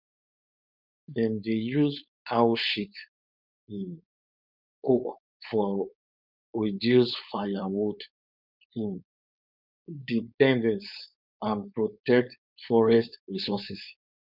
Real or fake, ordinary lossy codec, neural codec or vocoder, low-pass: fake; none; codec, 16 kHz, 4.8 kbps, FACodec; 5.4 kHz